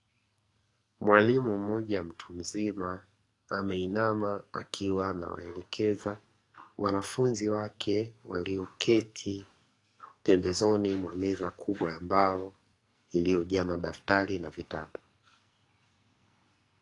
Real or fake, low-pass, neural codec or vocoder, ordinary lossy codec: fake; 10.8 kHz; codec, 44.1 kHz, 2.6 kbps, SNAC; AAC, 48 kbps